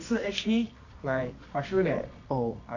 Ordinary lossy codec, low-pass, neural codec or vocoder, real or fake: AAC, 48 kbps; 7.2 kHz; codec, 24 kHz, 0.9 kbps, WavTokenizer, medium music audio release; fake